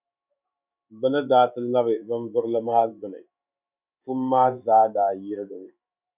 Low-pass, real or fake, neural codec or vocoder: 3.6 kHz; fake; codec, 16 kHz in and 24 kHz out, 1 kbps, XY-Tokenizer